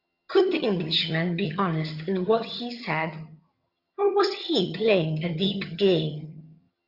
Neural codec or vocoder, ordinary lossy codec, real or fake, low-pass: vocoder, 22.05 kHz, 80 mel bands, HiFi-GAN; Opus, 64 kbps; fake; 5.4 kHz